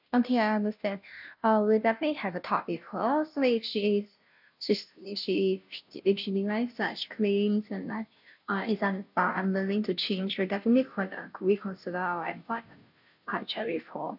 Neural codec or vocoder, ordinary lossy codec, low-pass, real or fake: codec, 16 kHz, 0.5 kbps, FunCodec, trained on Chinese and English, 25 frames a second; none; 5.4 kHz; fake